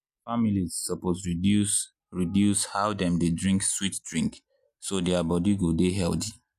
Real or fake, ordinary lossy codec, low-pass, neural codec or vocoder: real; none; 14.4 kHz; none